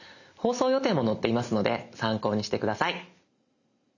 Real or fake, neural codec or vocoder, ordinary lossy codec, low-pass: real; none; none; 7.2 kHz